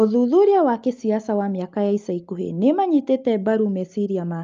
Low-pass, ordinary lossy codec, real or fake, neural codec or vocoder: 7.2 kHz; Opus, 32 kbps; real; none